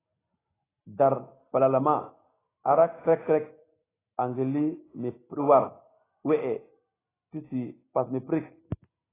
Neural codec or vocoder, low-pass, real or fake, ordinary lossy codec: none; 3.6 kHz; real; AAC, 16 kbps